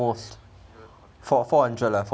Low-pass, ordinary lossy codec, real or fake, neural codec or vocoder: none; none; real; none